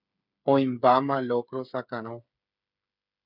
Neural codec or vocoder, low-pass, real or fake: codec, 16 kHz, 8 kbps, FreqCodec, smaller model; 5.4 kHz; fake